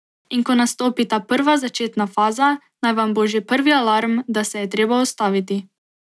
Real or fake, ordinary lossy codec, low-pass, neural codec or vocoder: real; none; none; none